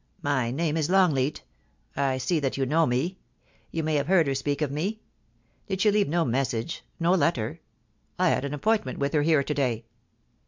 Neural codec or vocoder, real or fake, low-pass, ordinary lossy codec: none; real; 7.2 kHz; MP3, 64 kbps